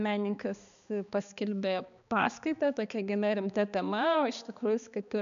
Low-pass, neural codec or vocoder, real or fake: 7.2 kHz; codec, 16 kHz, 2 kbps, X-Codec, HuBERT features, trained on balanced general audio; fake